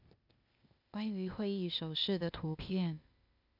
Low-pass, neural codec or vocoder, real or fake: 5.4 kHz; codec, 16 kHz, 0.8 kbps, ZipCodec; fake